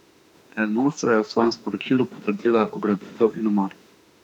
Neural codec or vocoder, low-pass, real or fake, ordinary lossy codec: autoencoder, 48 kHz, 32 numbers a frame, DAC-VAE, trained on Japanese speech; 19.8 kHz; fake; none